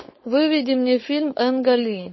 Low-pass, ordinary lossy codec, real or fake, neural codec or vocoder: 7.2 kHz; MP3, 24 kbps; fake; codec, 16 kHz, 4.8 kbps, FACodec